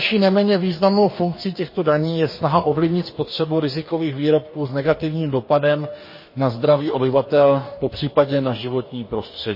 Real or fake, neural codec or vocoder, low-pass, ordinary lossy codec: fake; codec, 44.1 kHz, 2.6 kbps, DAC; 5.4 kHz; MP3, 24 kbps